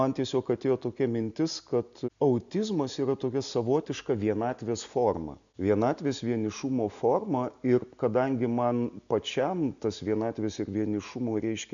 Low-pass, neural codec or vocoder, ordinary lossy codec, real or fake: 7.2 kHz; none; MP3, 96 kbps; real